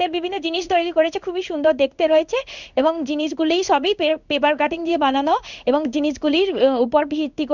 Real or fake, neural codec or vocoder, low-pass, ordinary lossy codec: fake; codec, 16 kHz in and 24 kHz out, 1 kbps, XY-Tokenizer; 7.2 kHz; none